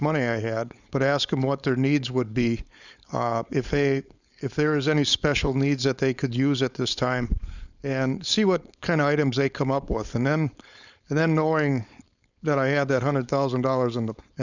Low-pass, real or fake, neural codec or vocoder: 7.2 kHz; fake; codec, 16 kHz, 4.8 kbps, FACodec